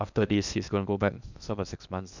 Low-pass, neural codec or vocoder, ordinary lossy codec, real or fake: 7.2 kHz; codec, 16 kHz in and 24 kHz out, 0.8 kbps, FocalCodec, streaming, 65536 codes; none; fake